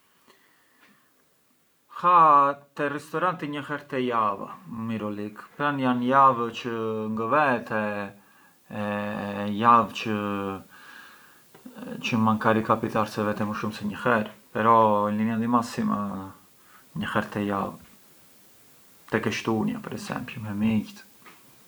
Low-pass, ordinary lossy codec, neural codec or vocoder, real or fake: none; none; none; real